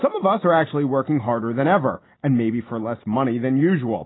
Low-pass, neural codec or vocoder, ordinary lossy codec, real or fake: 7.2 kHz; none; AAC, 16 kbps; real